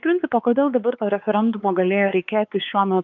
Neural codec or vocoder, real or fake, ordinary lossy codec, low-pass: codec, 16 kHz, 4 kbps, X-Codec, WavLM features, trained on Multilingual LibriSpeech; fake; Opus, 32 kbps; 7.2 kHz